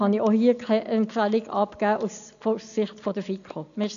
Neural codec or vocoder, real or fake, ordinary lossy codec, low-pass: none; real; none; 7.2 kHz